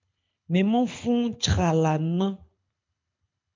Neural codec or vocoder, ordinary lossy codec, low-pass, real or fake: codec, 24 kHz, 6 kbps, HILCodec; MP3, 64 kbps; 7.2 kHz; fake